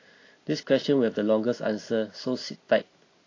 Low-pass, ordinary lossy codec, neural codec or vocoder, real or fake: 7.2 kHz; AAC, 32 kbps; none; real